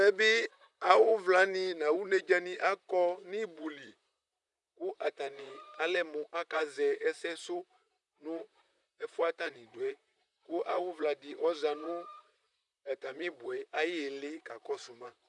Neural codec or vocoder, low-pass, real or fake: vocoder, 44.1 kHz, 128 mel bands, Pupu-Vocoder; 10.8 kHz; fake